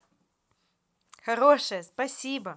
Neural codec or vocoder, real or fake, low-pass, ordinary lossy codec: none; real; none; none